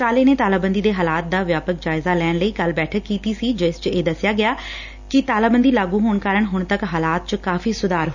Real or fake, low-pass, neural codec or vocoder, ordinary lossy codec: real; 7.2 kHz; none; none